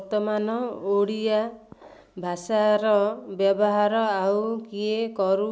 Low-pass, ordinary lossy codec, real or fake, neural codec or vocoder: none; none; real; none